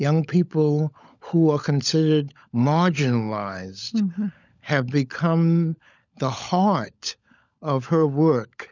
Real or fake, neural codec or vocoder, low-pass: fake; codec, 16 kHz, 16 kbps, FunCodec, trained on LibriTTS, 50 frames a second; 7.2 kHz